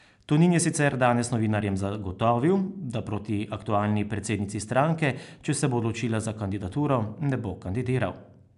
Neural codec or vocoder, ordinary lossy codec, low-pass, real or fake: none; AAC, 96 kbps; 10.8 kHz; real